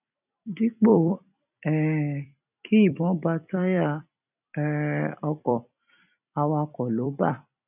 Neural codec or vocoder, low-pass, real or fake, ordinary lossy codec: vocoder, 44.1 kHz, 128 mel bands every 256 samples, BigVGAN v2; 3.6 kHz; fake; none